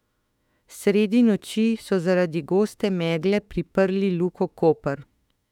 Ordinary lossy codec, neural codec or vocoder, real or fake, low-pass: none; autoencoder, 48 kHz, 32 numbers a frame, DAC-VAE, trained on Japanese speech; fake; 19.8 kHz